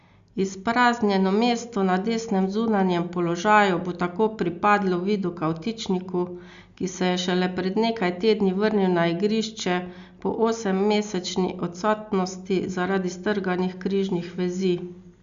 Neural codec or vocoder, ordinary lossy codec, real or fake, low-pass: none; Opus, 64 kbps; real; 7.2 kHz